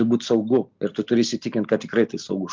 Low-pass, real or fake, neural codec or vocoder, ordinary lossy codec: 7.2 kHz; real; none; Opus, 24 kbps